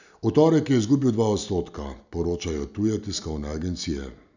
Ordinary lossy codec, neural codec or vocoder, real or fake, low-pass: none; none; real; 7.2 kHz